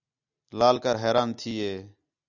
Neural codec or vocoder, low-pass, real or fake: none; 7.2 kHz; real